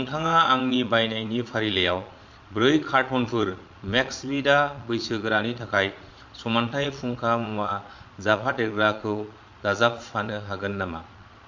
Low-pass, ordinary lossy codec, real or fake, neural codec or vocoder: 7.2 kHz; MP3, 48 kbps; fake; vocoder, 22.05 kHz, 80 mel bands, Vocos